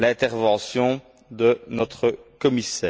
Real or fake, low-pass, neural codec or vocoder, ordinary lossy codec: real; none; none; none